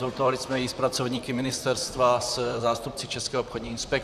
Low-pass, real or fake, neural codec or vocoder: 14.4 kHz; fake; vocoder, 44.1 kHz, 128 mel bands, Pupu-Vocoder